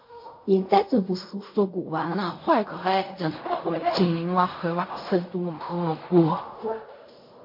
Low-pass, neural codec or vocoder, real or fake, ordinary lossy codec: 5.4 kHz; codec, 16 kHz in and 24 kHz out, 0.4 kbps, LongCat-Audio-Codec, fine tuned four codebook decoder; fake; MP3, 32 kbps